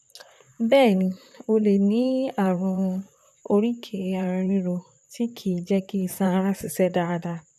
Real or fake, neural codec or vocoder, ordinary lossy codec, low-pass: fake; vocoder, 44.1 kHz, 128 mel bands, Pupu-Vocoder; none; 14.4 kHz